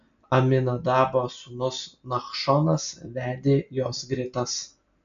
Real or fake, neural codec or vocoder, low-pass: real; none; 7.2 kHz